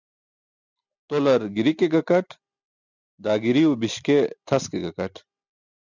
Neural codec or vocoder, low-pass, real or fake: none; 7.2 kHz; real